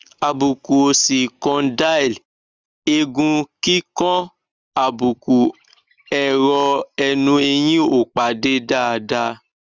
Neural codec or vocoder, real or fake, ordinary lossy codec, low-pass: none; real; Opus, 32 kbps; 7.2 kHz